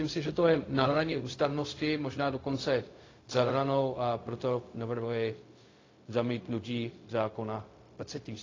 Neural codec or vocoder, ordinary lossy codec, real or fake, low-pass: codec, 16 kHz, 0.4 kbps, LongCat-Audio-Codec; AAC, 32 kbps; fake; 7.2 kHz